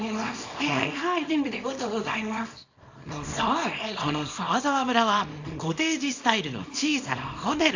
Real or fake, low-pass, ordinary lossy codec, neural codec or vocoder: fake; 7.2 kHz; AAC, 48 kbps; codec, 24 kHz, 0.9 kbps, WavTokenizer, small release